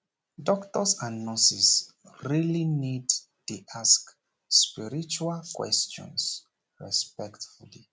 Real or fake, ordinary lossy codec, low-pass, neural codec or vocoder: real; none; none; none